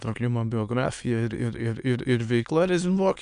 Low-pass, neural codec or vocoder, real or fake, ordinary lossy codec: 9.9 kHz; autoencoder, 22.05 kHz, a latent of 192 numbers a frame, VITS, trained on many speakers; fake; MP3, 96 kbps